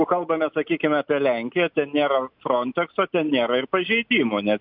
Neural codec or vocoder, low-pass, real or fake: none; 5.4 kHz; real